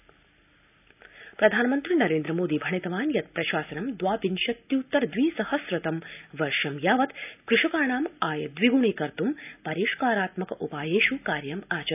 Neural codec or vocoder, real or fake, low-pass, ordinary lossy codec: none; real; 3.6 kHz; none